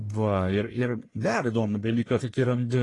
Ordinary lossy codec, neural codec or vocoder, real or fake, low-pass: AAC, 32 kbps; codec, 44.1 kHz, 1.7 kbps, Pupu-Codec; fake; 10.8 kHz